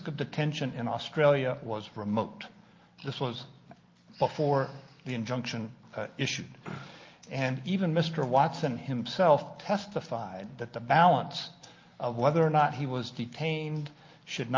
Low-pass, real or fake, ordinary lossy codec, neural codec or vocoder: 7.2 kHz; real; Opus, 24 kbps; none